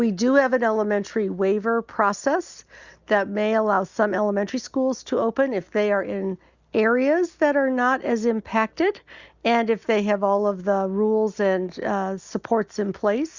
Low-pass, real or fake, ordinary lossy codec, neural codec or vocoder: 7.2 kHz; real; Opus, 64 kbps; none